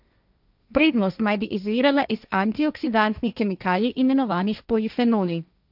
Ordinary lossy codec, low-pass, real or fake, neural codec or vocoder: none; 5.4 kHz; fake; codec, 16 kHz, 1.1 kbps, Voila-Tokenizer